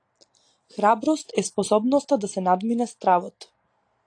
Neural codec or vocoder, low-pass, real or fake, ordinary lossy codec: none; 9.9 kHz; real; AAC, 48 kbps